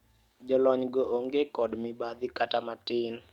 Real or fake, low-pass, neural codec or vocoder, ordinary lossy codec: fake; 19.8 kHz; codec, 44.1 kHz, 7.8 kbps, DAC; Opus, 64 kbps